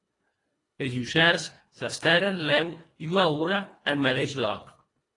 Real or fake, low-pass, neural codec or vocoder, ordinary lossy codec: fake; 10.8 kHz; codec, 24 kHz, 1.5 kbps, HILCodec; AAC, 32 kbps